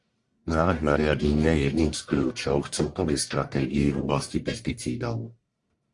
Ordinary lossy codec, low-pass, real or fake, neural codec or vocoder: Opus, 64 kbps; 10.8 kHz; fake; codec, 44.1 kHz, 1.7 kbps, Pupu-Codec